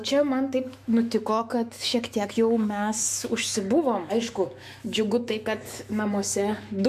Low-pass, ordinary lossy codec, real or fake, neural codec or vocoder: 14.4 kHz; MP3, 96 kbps; fake; autoencoder, 48 kHz, 128 numbers a frame, DAC-VAE, trained on Japanese speech